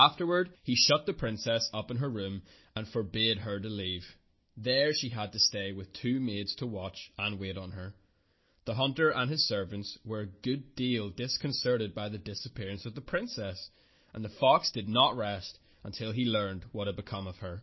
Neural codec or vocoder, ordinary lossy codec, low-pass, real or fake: none; MP3, 24 kbps; 7.2 kHz; real